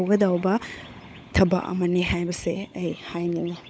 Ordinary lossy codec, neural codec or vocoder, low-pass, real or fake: none; codec, 16 kHz, 16 kbps, FunCodec, trained on LibriTTS, 50 frames a second; none; fake